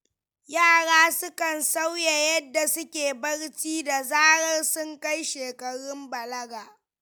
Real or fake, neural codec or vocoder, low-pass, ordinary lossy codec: real; none; none; none